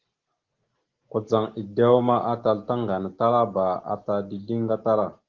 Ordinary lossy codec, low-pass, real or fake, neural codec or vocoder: Opus, 16 kbps; 7.2 kHz; real; none